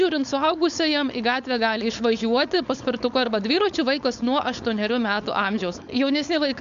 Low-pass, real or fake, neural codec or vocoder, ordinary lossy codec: 7.2 kHz; fake; codec, 16 kHz, 4.8 kbps, FACodec; AAC, 96 kbps